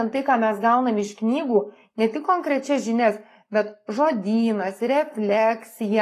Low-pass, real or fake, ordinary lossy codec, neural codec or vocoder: 14.4 kHz; fake; AAC, 48 kbps; codec, 44.1 kHz, 7.8 kbps, Pupu-Codec